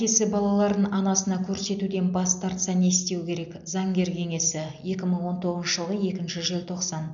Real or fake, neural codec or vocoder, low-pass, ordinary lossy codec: real; none; 7.2 kHz; none